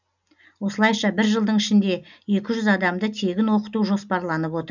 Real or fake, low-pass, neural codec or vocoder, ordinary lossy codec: real; 7.2 kHz; none; none